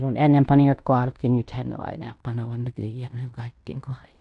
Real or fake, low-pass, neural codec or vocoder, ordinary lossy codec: fake; 10.8 kHz; codec, 16 kHz in and 24 kHz out, 0.9 kbps, LongCat-Audio-Codec, fine tuned four codebook decoder; none